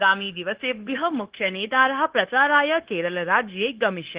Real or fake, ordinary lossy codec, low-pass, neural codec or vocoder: fake; Opus, 16 kbps; 3.6 kHz; codec, 16 kHz, 0.9 kbps, LongCat-Audio-Codec